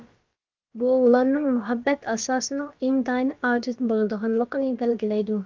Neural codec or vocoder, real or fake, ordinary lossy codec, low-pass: codec, 16 kHz, about 1 kbps, DyCAST, with the encoder's durations; fake; Opus, 24 kbps; 7.2 kHz